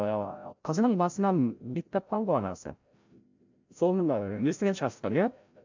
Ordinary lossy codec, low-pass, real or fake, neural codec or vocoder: MP3, 64 kbps; 7.2 kHz; fake; codec, 16 kHz, 0.5 kbps, FreqCodec, larger model